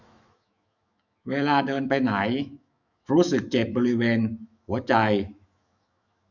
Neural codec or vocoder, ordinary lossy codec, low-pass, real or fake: autoencoder, 48 kHz, 128 numbers a frame, DAC-VAE, trained on Japanese speech; none; 7.2 kHz; fake